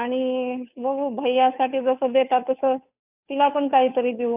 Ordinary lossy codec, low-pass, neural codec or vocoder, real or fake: none; 3.6 kHz; codec, 16 kHz, 2 kbps, FunCodec, trained on Chinese and English, 25 frames a second; fake